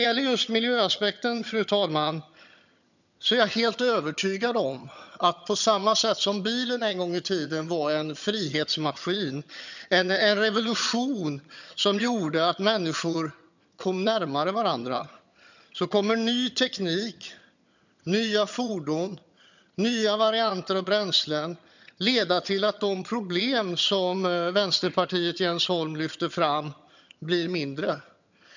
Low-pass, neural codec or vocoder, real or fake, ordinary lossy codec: 7.2 kHz; vocoder, 22.05 kHz, 80 mel bands, HiFi-GAN; fake; none